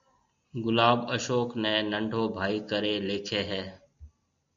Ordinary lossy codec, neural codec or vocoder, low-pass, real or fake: MP3, 64 kbps; none; 7.2 kHz; real